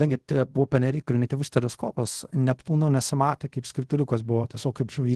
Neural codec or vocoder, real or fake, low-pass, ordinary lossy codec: codec, 24 kHz, 0.5 kbps, DualCodec; fake; 10.8 kHz; Opus, 16 kbps